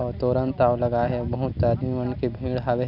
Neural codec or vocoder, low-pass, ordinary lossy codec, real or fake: none; 5.4 kHz; none; real